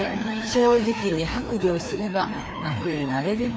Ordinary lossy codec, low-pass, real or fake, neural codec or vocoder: none; none; fake; codec, 16 kHz, 2 kbps, FreqCodec, larger model